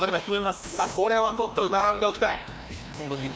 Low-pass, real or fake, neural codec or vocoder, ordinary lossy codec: none; fake; codec, 16 kHz, 1 kbps, FreqCodec, larger model; none